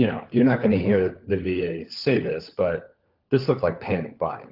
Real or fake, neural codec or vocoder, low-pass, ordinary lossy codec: fake; codec, 16 kHz, 4 kbps, FunCodec, trained on LibriTTS, 50 frames a second; 5.4 kHz; Opus, 32 kbps